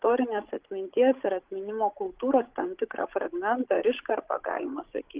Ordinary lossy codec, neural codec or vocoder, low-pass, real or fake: Opus, 16 kbps; codec, 16 kHz, 16 kbps, FreqCodec, larger model; 3.6 kHz; fake